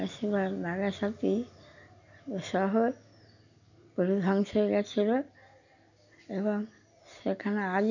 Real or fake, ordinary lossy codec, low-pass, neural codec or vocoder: real; none; 7.2 kHz; none